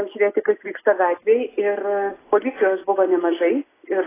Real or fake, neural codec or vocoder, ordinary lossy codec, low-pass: real; none; AAC, 16 kbps; 3.6 kHz